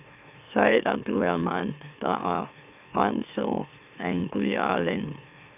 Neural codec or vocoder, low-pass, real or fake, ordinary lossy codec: autoencoder, 44.1 kHz, a latent of 192 numbers a frame, MeloTTS; 3.6 kHz; fake; none